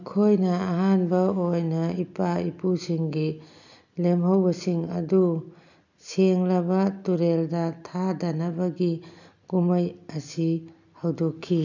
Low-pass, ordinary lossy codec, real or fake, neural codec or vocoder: 7.2 kHz; none; real; none